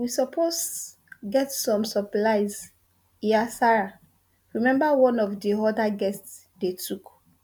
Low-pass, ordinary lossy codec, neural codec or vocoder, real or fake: none; none; none; real